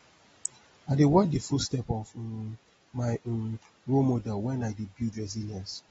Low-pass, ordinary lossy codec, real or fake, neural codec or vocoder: 19.8 kHz; AAC, 24 kbps; real; none